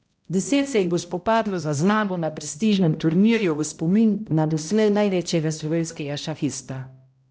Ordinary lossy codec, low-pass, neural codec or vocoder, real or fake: none; none; codec, 16 kHz, 0.5 kbps, X-Codec, HuBERT features, trained on balanced general audio; fake